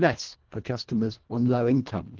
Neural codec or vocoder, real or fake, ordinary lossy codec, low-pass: codec, 24 kHz, 1.5 kbps, HILCodec; fake; Opus, 16 kbps; 7.2 kHz